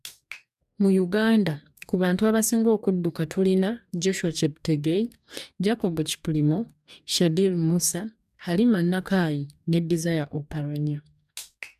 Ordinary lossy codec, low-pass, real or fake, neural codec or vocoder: none; 14.4 kHz; fake; codec, 44.1 kHz, 2.6 kbps, DAC